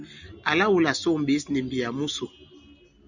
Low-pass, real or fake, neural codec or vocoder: 7.2 kHz; real; none